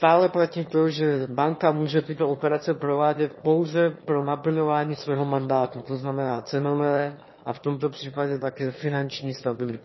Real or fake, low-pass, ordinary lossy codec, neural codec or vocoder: fake; 7.2 kHz; MP3, 24 kbps; autoencoder, 22.05 kHz, a latent of 192 numbers a frame, VITS, trained on one speaker